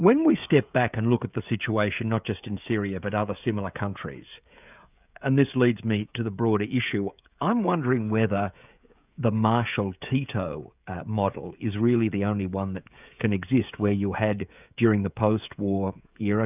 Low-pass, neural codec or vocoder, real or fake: 3.6 kHz; codec, 16 kHz, 16 kbps, FreqCodec, smaller model; fake